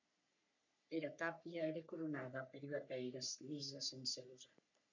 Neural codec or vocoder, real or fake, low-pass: codec, 44.1 kHz, 3.4 kbps, Pupu-Codec; fake; 7.2 kHz